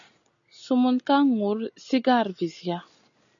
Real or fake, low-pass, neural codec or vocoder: real; 7.2 kHz; none